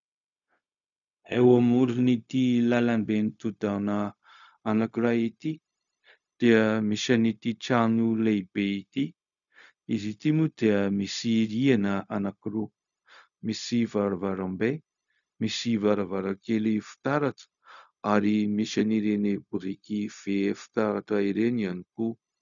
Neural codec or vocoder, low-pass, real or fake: codec, 16 kHz, 0.4 kbps, LongCat-Audio-Codec; 7.2 kHz; fake